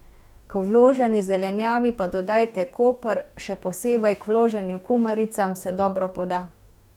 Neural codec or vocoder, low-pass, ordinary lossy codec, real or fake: codec, 44.1 kHz, 2.6 kbps, DAC; 19.8 kHz; none; fake